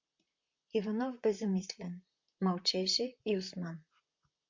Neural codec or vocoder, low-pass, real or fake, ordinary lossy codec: vocoder, 44.1 kHz, 128 mel bands, Pupu-Vocoder; 7.2 kHz; fake; MP3, 64 kbps